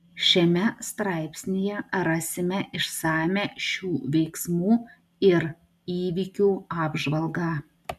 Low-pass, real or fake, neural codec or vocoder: 14.4 kHz; real; none